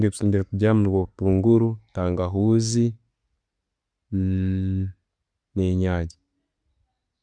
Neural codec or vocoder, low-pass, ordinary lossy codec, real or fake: none; 9.9 kHz; Opus, 64 kbps; real